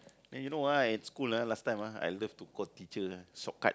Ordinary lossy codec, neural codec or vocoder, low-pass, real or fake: none; none; none; real